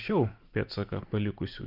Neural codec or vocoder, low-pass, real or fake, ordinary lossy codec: none; 5.4 kHz; real; Opus, 32 kbps